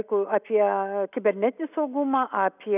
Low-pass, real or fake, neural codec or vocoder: 3.6 kHz; real; none